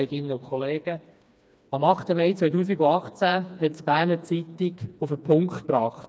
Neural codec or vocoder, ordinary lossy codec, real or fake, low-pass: codec, 16 kHz, 2 kbps, FreqCodec, smaller model; none; fake; none